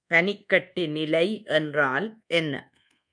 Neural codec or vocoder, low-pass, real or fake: codec, 24 kHz, 1.2 kbps, DualCodec; 9.9 kHz; fake